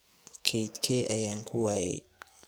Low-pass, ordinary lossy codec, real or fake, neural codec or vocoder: none; none; fake; codec, 44.1 kHz, 2.6 kbps, SNAC